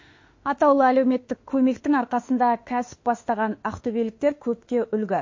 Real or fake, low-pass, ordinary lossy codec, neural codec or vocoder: fake; 7.2 kHz; MP3, 32 kbps; autoencoder, 48 kHz, 32 numbers a frame, DAC-VAE, trained on Japanese speech